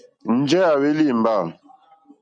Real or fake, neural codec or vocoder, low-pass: real; none; 9.9 kHz